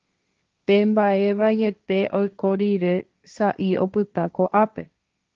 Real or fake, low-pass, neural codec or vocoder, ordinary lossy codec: fake; 7.2 kHz; codec, 16 kHz, 1.1 kbps, Voila-Tokenizer; Opus, 24 kbps